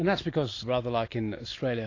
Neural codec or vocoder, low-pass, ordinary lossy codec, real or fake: none; 7.2 kHz; AAC, 32 kbps; real